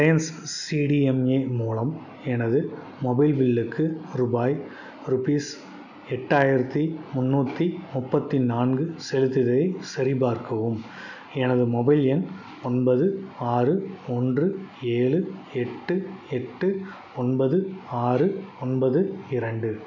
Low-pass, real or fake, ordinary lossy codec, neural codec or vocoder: 7.2 kHz; real; none; none